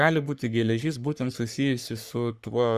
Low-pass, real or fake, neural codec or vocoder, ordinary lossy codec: 14.4 kHz; fake; codec, 44.1 kHz, 3.4 kbps, Pupu-Codec; Opus, 64 kbps